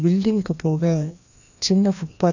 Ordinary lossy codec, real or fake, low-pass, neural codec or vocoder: none; fake; 7.2 kHz; codec, 16 kHz, 1 kbps, FreqCodec, larger model